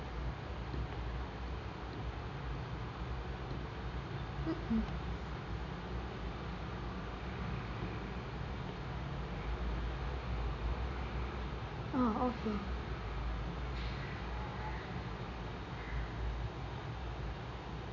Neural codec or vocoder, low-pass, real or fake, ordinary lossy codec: none; 7.2 kHz; real; none